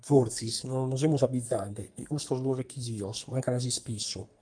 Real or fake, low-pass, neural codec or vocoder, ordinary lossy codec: fake; 9.9 kHz; codec, 44.1 kHz, 2.6 kbps, SNAC; Opus, 32 kbps